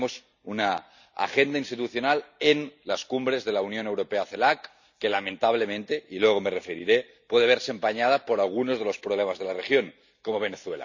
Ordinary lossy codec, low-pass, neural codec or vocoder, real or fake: none; 7.2 kHz; none; real